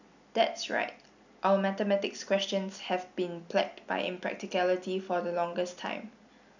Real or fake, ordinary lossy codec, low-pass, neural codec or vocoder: real; none; 7.2 kHz; none